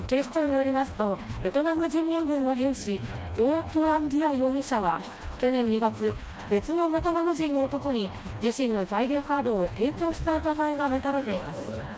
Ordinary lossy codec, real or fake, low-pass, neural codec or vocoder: none; fake; none; codec, 16 kHz, 1 kbps, FreqCodec, smaller model